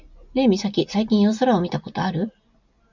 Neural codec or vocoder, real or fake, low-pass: none; real; 7.2 kHz